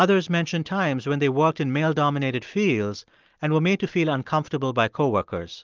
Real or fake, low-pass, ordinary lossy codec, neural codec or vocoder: real; 7.2 kHz; Opus, 32 kbps; none